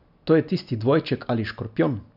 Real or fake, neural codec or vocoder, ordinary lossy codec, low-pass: real; none; none; 5.4 kHz